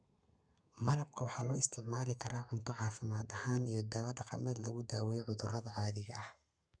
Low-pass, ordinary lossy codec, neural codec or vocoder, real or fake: 9.9 kHz; none; codec, 32 kHz, 1.9 kbps, SNAC; fake